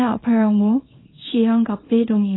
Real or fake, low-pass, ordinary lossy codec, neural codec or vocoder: fake; 7.2 kHz; AAC, 16 kbps; codec, 24 kHz, 0.9 kbps, WavTokenizer, small release